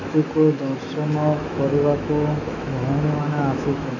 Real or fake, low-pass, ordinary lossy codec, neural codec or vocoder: fake; 7.2 kHz; none; autoencoder, 48 kHz, 128 numbers a frame, DAC-VAE, trained on Japanese speech